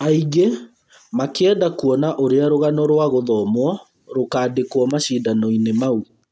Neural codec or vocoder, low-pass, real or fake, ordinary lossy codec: none; none; real; none